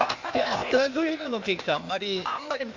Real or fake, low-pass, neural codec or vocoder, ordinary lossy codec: fake; 7.2 kHz; codec, 16 kHz, 0.8 kbps, ZipCodec; MP3, 48 kbps